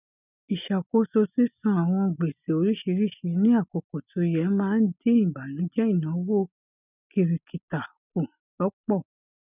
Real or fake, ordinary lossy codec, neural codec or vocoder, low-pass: real; none; none; 3.6 kHz